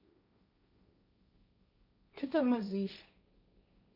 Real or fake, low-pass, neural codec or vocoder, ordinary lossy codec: fake; 5.4 kHz; codec, 16 kHz, 1.1 kbps, Voila-Tokenizer; none